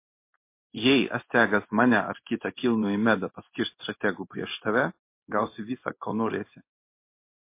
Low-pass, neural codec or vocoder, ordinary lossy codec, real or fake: 3.6 kHz; codec, 16 kHz in and 24 kHz out, 1 kbps, XY-Tokenizer; MP3, 24 kbps; fake